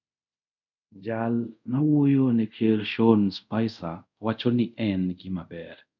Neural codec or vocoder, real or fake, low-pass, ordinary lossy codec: codec, 24 kHz, 0.5 kbps, DualCodec; fake; 7.2 kHz; none